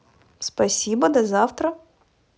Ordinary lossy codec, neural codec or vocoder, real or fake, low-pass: none; none; real; none